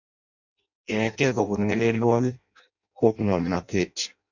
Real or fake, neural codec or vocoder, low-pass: fake; codec, 16 kHz in and 24 kHz out, 0.6 kbps, FireRedTTS-2 codec; 7.2 kHz